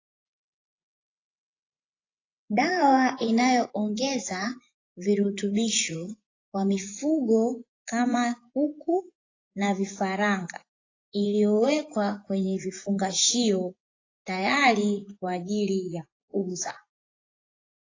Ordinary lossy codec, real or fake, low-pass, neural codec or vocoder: AAC, 32 kbps; fake; 7.2 kHz; vocoder, 24 kHz, 100 mel bands, Vocos